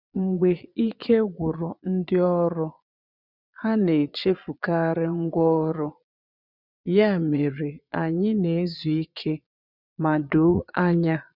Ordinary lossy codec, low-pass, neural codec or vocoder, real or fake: none; 5.4 kHz; vocoder, 22.05 kHz, 80 mel bands, Vocos; fake